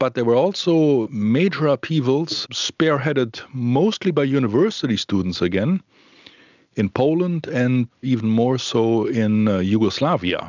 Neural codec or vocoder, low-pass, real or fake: none; 7.2 kHz; real